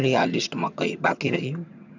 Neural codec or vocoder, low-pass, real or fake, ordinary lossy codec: vocoder, 22.05 kHz, 80 mel bands, HiFi-GAN; 7.2 kHz; fake; none